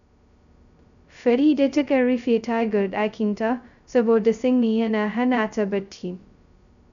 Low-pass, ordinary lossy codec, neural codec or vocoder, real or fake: 7.2 kHz; none; codec, 16 kHz, 0.2 kbps, FocalCodec; fake